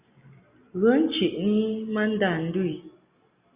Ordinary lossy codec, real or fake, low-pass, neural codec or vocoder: Opus, 64 kbps; real; 3.6 kHz; none